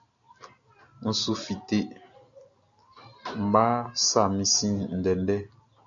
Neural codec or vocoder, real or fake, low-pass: none; real; 7.2 kHz